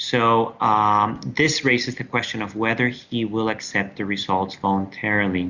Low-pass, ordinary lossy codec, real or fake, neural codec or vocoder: 7.2 kHz; Opus, 64 kbps; real; none